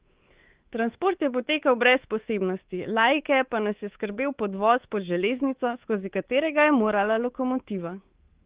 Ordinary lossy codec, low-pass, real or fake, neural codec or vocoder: Opus, 16 kbps; 3.6 kHz; fake; codec, 24 kHz, 3.1 kbps, DualCodec